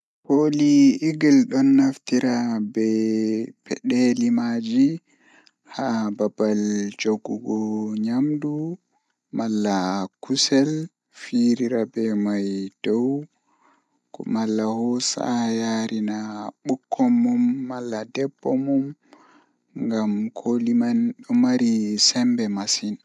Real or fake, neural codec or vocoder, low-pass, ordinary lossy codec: real; none; none; none